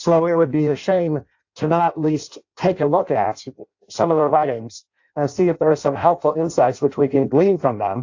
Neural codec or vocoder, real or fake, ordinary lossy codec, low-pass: codec, 16 kHz in and 24 kHz out, 0.6 kbps, FireRedTTS-2 codec; fake; AAC, 48 kbps; 7.2 kHz